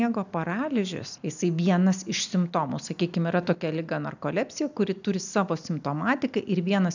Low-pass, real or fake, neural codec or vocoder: 7.2 kHz; real; none